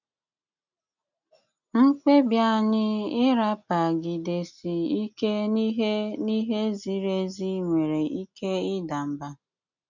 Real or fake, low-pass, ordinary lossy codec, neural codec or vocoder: real; 7.2 kHz; none; none